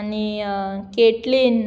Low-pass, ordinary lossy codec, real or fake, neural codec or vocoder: none; none; real; none